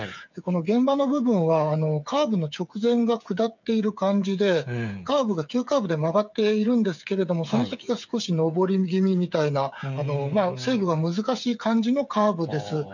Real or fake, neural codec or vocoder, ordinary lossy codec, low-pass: fake; codec, 16 kHz, 8 kbps, FreqCodec, smaller model; none; 7.2 kHz